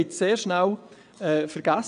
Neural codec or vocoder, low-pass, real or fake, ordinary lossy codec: vocoder, 22.05 kHz, 80 mel bands, WaveNeXt; 9.9 kHz; fake; none